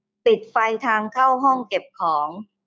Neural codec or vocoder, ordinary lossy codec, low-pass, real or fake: codec, 16 kHz, 6 kbps, DAC; none; none; fake